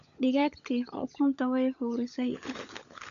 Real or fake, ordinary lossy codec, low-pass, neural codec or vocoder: fake; none; 7.2 kHz; codec, 16 kHz, 16 kbps, FunCodec, trained on LibriTTS, 50 frames a second